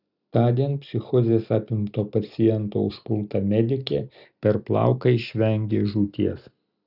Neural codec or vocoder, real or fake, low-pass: none; real; 5.4 kHz